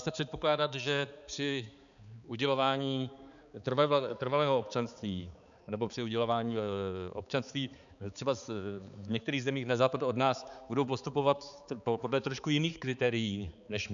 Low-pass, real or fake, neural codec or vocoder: 7.2 kHz; fake; codec, 16 kHz, 4 kbps, X-Codec, HuBERT features, trained on balanced general audio